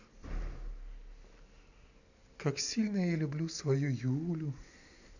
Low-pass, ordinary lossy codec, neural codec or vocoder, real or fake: 7.2 kHz; none; none; real